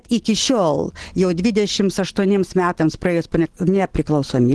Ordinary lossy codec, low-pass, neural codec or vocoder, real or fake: Opus, 16 kbps; 10.8 kHz; none; real